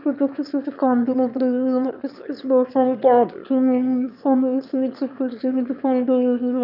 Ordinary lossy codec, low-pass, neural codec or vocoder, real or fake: none; 5.4 kHz; autoencoder, 22.05 kHz, a latent of 192 numbers a frame, VITS, trained on one speaker; fake